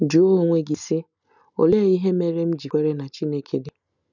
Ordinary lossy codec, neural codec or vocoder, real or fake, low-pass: none; none; real; 7.2 kHz